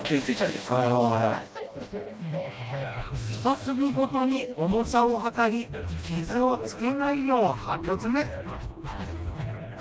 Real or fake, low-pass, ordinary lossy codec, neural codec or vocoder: fake; none; none; codec, 16 kHz, 1 kbps, FreqCodec, smaller model